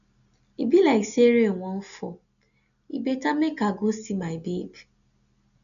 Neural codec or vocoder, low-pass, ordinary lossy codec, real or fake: none; 7.2 kHz; none; real